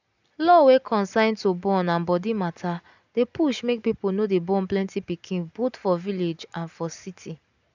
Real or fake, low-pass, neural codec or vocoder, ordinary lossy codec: real; 7.2 kHz; none; none